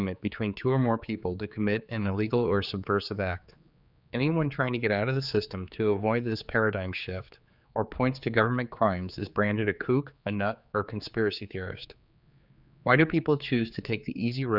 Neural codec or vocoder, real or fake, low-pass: codec, 16 kHz, 4 kbps, X-Codec, HuBERT features, trained on general audio; fake; 5.4 kHz